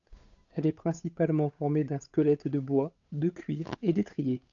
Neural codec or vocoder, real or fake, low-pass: codec, 16 kHz, 8 kbps, FunCodec, trained on Chinese and English, 25 frames a second; fake; 7.2 kHz